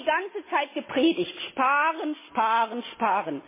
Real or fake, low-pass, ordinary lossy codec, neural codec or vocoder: real; 3.6 kHz; MP3, 16 kbps; none